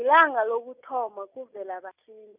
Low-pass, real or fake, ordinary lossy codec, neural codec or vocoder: 3.6 kHz; real; none; none